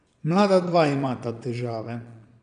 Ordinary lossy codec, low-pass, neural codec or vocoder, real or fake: none; 9.9 kHz; vocoder, 22.05 kHz, 80 mel bands, Vocos; fake